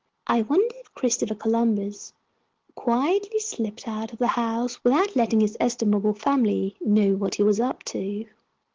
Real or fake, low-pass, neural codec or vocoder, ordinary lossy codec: real; 7.2 kHz; none; Opus, 16 kbps